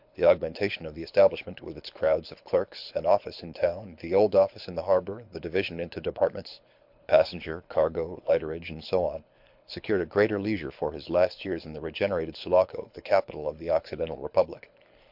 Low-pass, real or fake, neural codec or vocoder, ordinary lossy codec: 5.4 kHz; fake; codec, 24 kHz, 6 kbps, HILCodec; MP3, 48 kbps